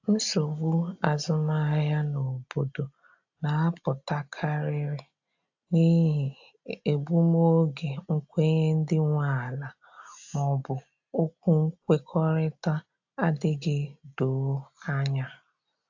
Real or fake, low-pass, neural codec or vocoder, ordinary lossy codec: real; 7.2 kHz; none; AAC, 48 kbps